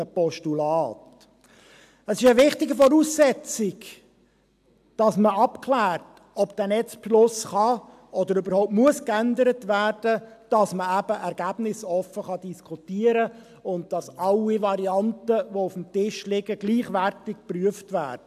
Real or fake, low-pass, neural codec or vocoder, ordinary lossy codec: real; 14.4 kHz; none; AAC, 96 kbps